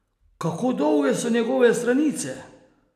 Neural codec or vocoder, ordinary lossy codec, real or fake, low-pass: none; none; real; 14.4 kHz